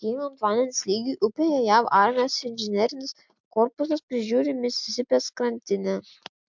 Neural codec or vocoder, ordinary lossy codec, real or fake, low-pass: none; MP3, 64 kbps; real; 7.2 kHz